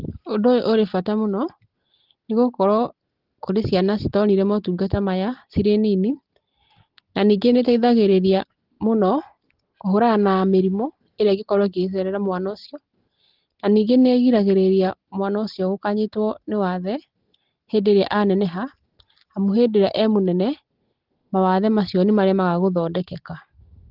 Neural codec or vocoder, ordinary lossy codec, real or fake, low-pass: none; Opus, 16 kbps; real; 5.4 kHz